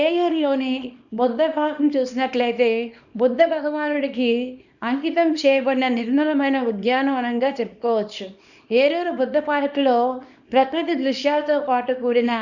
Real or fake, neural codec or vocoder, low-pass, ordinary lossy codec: fake; codec, 24 kHz, 0.9 kbps, WavTokenizer, small release; 7.2 kHz; none